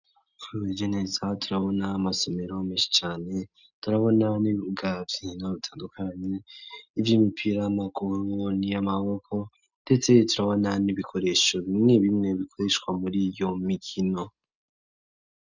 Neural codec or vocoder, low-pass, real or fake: none; 7.2 kHz; real